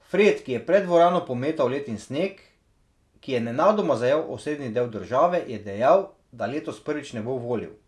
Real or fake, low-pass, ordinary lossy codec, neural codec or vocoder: real; none; none; none